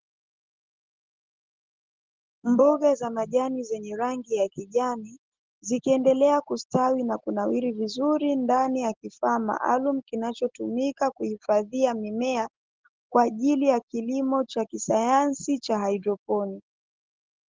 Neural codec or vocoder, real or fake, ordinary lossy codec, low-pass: none; real; Opus, 16 kbps; 7.2 kHz